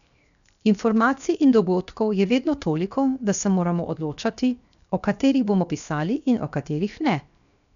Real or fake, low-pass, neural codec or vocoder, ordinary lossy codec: fake; 7.2 kHz; codec, 16 kHz, 0.7 kbps, FocalCodec; none